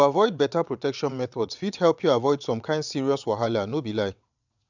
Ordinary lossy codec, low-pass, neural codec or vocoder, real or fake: none; 7.2 kHz; vocoder, 22.05 kHz, 80 mel bands, WaveNeXt; fake